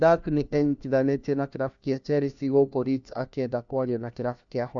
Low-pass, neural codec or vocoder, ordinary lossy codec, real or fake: 7.2 kHz; codec, 16 kHz, 1 kbps, FunCodec, trained on LibriTTS, 50 frames a second; MP3, 64 kbps; fake